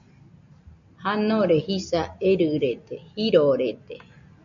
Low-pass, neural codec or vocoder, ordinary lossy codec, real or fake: 7.2 kHz; none; MP3, 96 kbps; real